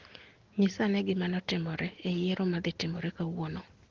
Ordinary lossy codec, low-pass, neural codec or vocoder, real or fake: Opus, 16 kbps; 7.2 kHz; none; real